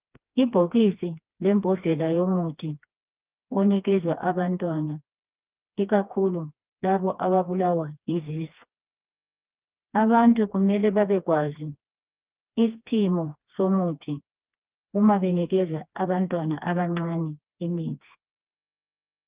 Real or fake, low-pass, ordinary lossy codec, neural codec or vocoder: fake; 3.6 kHz; Opus, 24 kbps; codec, 16 kHz, 2 kbps, FreqCodec, smaller model